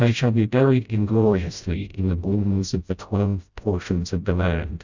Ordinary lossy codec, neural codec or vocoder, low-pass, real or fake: Opus, 64 kbps; codec, 16 kHz, 0.5 kbps, FreqCodec, smaller model; 7.2 kHz; fake